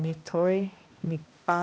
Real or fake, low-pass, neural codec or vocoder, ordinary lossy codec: fake; none; codec, 16 kHz, 1 kbps, X-Codec, HuBERT features, trained on balanced general audio; none